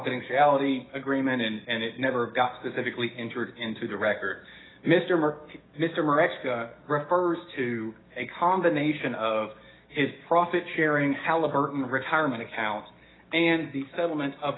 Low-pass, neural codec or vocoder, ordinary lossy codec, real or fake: 7.2 kHz; codec, 16 kHz, 6 kbps, DAC; AAC, 16 kbps; fake